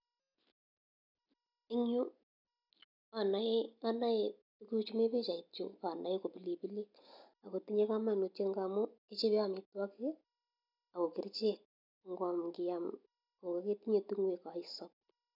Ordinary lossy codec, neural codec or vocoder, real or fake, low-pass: none; none; real; 5.4 kHz